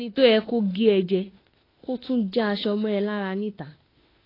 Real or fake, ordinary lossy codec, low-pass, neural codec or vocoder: fake; AAC, 24 kbps; 5.4 kHz; autoencoder, 48 kHz, 32 numbers a frame, DAC-VAE, trained on Japanese speech